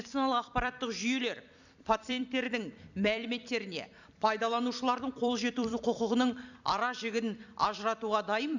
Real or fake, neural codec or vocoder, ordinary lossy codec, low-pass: fake; vocoder, 44.1 kHz, 128 mel bands every 256 samples, BigVGAN v2; none; 7.2 kHz